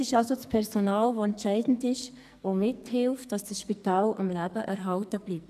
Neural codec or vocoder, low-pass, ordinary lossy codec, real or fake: codec, 44.1 kHz, 2.6 kbps, SNAC; 14.4 kHz; none; fake